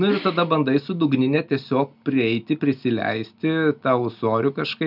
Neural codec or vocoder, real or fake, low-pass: none; real; 5.4 kHz